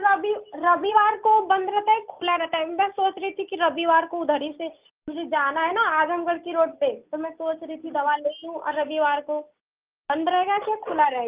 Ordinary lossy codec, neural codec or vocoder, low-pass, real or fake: Opus, 24 kbps; none; 3.6 kHz; real